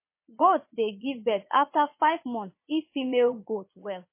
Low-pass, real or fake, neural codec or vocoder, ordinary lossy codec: 3.6 kHz; fake; vocoder, 22.05 kHz, 80 mel bands, Vocos; MP3, 24 kbps